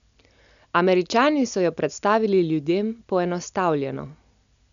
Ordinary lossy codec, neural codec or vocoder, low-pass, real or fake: none; none; 7.2 kHz; real